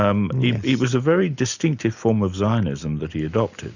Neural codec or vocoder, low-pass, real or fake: none; 7.2 kHz; real